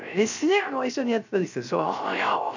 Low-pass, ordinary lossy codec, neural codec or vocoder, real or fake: 7.2 kHz; none; codec, 16 kHz, 0.3 kbps, FocalCodec; fake